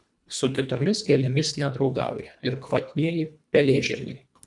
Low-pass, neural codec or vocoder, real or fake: 10.8 kHz; codec, 24 kHz, 1.5 kbps, HILCodec; fake